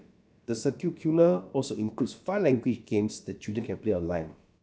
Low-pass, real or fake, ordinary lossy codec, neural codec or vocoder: none; fake; none; codec, 16 kHz, about 1 kbps, DyCAST, with the encoder's durations